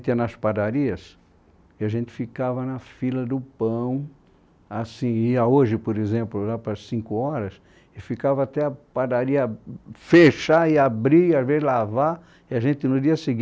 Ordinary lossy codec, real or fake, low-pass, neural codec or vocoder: none; real; none; none